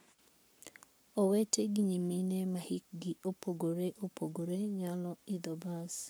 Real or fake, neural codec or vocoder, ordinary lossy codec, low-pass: fake; codec, 44.1 kHz, 7.8 kbps, Pupu-Codec; none; none